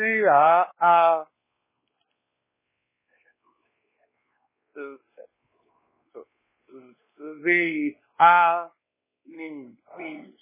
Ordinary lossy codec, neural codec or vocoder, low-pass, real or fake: MP3, 16 kbps; codec, 16 kHz, 2 kbps, X-Codec, WavLM features, trained on Multilingual LibriSpeech; 3.6 kHz; fake